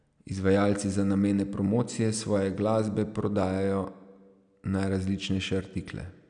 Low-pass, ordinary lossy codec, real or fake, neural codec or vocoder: 9.9 kHz; none; real; none